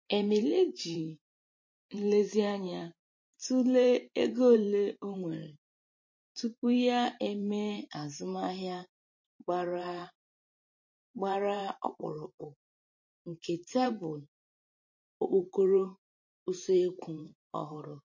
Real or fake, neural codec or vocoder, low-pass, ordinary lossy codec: fake; vocoder, 44.1 kHz, 128 mel bands every 512 samples, BigVGAN v2; 7.2 kHz; MP3, 32 kbps